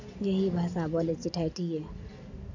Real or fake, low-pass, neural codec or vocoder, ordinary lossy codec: real; 7.2 kHz; none; none